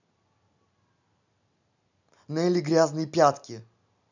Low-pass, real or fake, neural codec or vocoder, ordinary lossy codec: 7.2 kHz; real; none; none